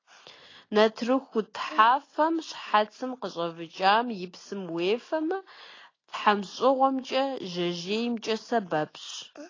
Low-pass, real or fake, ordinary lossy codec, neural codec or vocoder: 7.2 kHz; real; AAC, 32 kbps; none